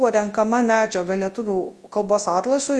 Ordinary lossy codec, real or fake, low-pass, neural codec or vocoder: Opus, 24 kbps; fake; 10.8 kHz; codec, 24 kHz, 0.9 kbps, WavTokenizer, large speech release